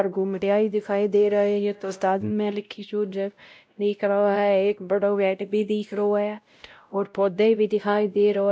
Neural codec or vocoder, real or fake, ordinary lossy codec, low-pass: codec, 16 kHz, 0.5 kbps, X-Codec, WavLM features, trained on Multilingual LibriSpeech; fake; none; none